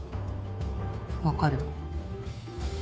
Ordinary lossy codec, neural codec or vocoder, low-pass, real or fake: none; codec, 16 kHz, 2 kbps, FunCodec, trained on Chinese and English, 25 frames a second; none; fake